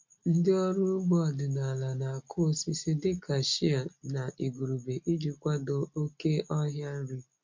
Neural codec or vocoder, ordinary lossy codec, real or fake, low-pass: none; MP3, 48 kbps; real; 7.2 kHz